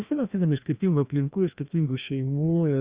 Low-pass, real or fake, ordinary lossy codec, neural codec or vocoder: 3.6 kHz; fake; Opus, 64 kbps; codec, 16 kHz, 1 kbps, FreqCodec, larger model